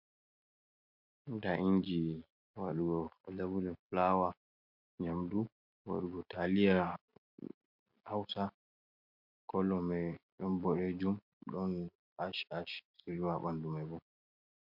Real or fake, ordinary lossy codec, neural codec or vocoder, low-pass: real; MP3, 48 kbps; none; 5.4 kHz